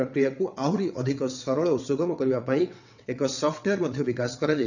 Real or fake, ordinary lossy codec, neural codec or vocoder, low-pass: fake; none; vocoder, 22.05 kHz, 80 mel bands, WaveNeXt; 7.2 kHz